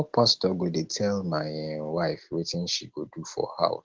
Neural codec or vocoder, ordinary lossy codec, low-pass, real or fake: none; Opus, 16 kbps; 7.2 kHz; real